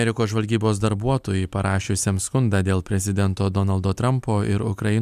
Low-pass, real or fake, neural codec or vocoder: 14.4 kHz; real; none